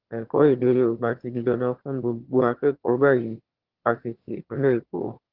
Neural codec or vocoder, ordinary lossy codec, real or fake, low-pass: autoencoder, 22.05 kHz, a latent of 192 numbers a frame, VITS, trained on one speaker; Opus, 16 kbps; fake; 5.4 kHz